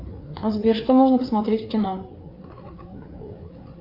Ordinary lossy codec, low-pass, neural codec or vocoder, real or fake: AAC, 32 kbps; 5.4 kHz; codec, 16 kHz, 4 kbps, FreqCodec, larger model; fake